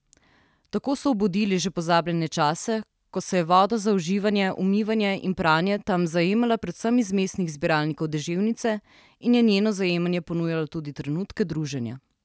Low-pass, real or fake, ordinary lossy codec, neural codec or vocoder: none; real; none; none